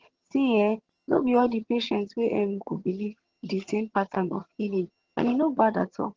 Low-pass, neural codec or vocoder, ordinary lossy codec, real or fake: 7.2 kHz; vocoder, 22.05 kHz, 80 mel bands, HiFi-GAN; Opus, 16 kbps; fake